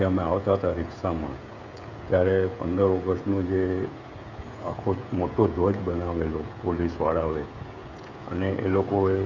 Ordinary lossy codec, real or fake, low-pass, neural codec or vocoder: none; fake; 7.2 kHz; codec, 16 kHz, 16 kbps, FreqCodec, smaller model